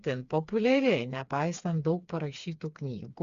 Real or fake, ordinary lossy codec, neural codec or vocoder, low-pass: fake; Opus, 64 kbps; codec, 16 kHz, 4 kbps, FreqCodec, smaller model; 7.2 kHz